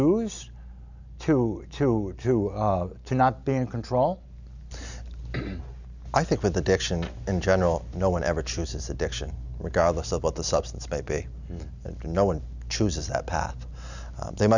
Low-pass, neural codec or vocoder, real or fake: 7.2 kHz; none; real